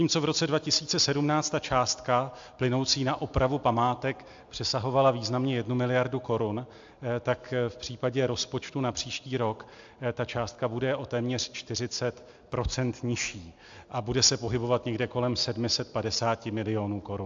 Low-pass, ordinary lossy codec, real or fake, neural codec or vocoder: 7.2 kHz; AAC, 64 kbps; real; none